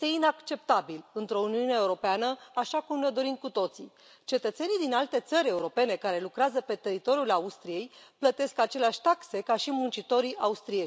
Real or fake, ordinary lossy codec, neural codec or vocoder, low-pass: real; none; none; none